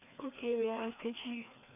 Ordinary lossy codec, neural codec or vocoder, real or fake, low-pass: none; codec, 16 kHz, 2 kbps, FreqCodec, larger model; fake; 3.6 kHz